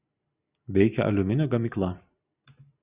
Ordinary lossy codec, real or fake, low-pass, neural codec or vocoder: Opus, 64 kbps; real; 3.6 kHz; none